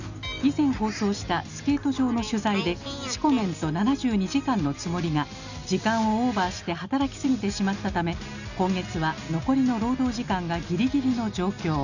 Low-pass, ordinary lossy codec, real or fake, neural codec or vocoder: 7.2 kHz; none; real; none